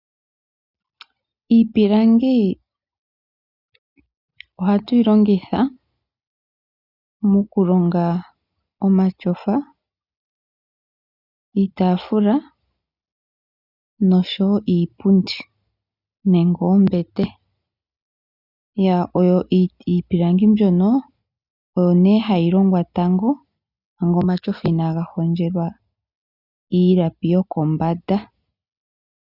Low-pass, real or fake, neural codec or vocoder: 5.4 kHz; real; none